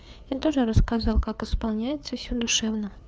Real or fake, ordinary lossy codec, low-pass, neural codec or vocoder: fake; none; none; codec, 16 kHz, 4 kbps, FreqCodec, larger model